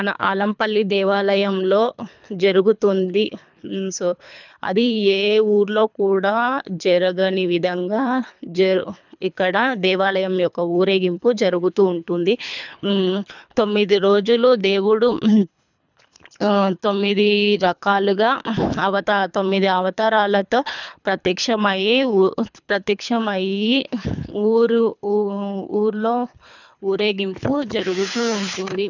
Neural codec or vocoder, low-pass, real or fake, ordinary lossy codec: codec, 24 kHz, 3 kbps, HILCodec; 7.2 kHz; fake; none